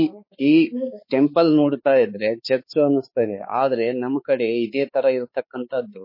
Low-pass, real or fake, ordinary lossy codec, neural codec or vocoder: 5.4 kHz; fake; MP3, 24 kbps; codec, 16 kHz, 4 kbps, X-Codec, HuBERT features, trained on balanced general audio